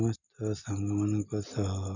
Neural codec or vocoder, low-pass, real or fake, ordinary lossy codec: none; 7.2 kHz; real; none